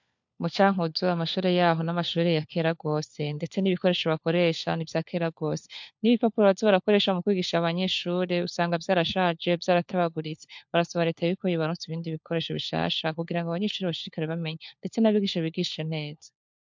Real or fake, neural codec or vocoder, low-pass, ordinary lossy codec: fake; codec, 16 kHz, 4 kbps, FunCodec, trained on LibriTTS, 50 frames a second; 7.2 kHz; MP3, 64 kbps